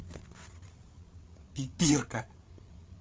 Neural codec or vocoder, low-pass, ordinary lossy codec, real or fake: codec, 16 kHz, 8 kbps, FreqCodec, larger model; none; none; fake